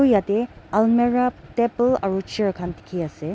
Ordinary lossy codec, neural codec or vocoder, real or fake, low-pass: none; none; real; none